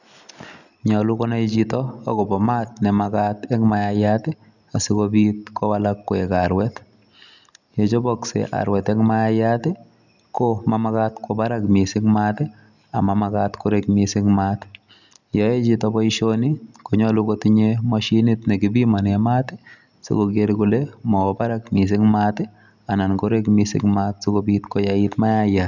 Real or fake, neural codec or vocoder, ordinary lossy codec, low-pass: real; none; none; 7.2 kHz